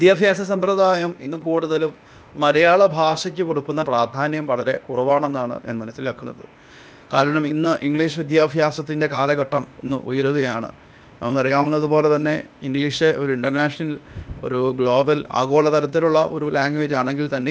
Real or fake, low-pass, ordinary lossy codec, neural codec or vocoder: fake; none; none; codec, 16 kHz, 0.8 kbps, ZipCodec